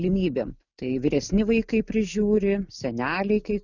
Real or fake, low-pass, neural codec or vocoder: real; 7.2 kHz; none